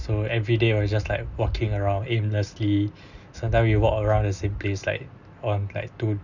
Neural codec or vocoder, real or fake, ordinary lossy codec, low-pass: none; real; none; 7.2 kHz